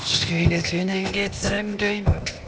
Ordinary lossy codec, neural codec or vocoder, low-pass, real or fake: none; codec, 16 kHz, 0.8 kbps, ZipCodec; none; fake